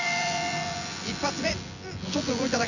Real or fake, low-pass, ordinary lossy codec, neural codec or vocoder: fake; 7.2 kHz; none; vocoder, 24 kHz, 100 mel bands, Vocos